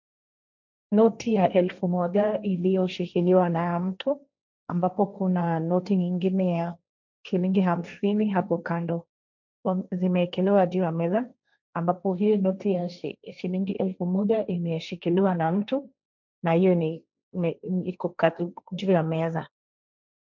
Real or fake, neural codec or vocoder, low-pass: fake; codec, 16 kHz, 1.1 kbps, Voila-Tokenizer; 7.2 kHz